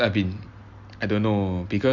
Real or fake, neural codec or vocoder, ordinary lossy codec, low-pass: real; none; Opus, 64 kbps; 7.2 kHz